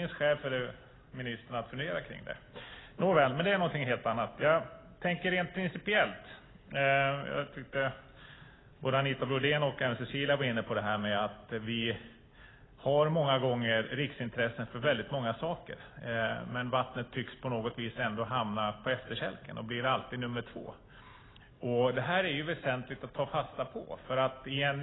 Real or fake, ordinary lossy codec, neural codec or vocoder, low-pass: real; AAC, 16 kbps; none; 7.2 kHz